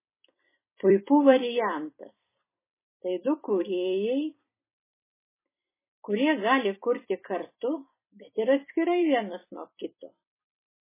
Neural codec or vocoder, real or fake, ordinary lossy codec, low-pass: vocoder, 44.1 kHz, 128 mel bands every 256 samples, BigVGAN v2; fake; MP3, 16 kbps; 3.6 kHz